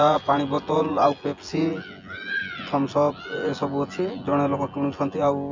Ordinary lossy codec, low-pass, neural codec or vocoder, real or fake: MP3, 48 kbps; 7.2 kHz; vocoder, 24 kHz, 100 mel bands, Vocos; fake